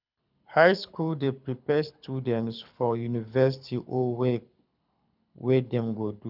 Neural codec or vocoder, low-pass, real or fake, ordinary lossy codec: codec, 24 kHz, 6 kbps, HILCodec; 5.4 kHz; fake; none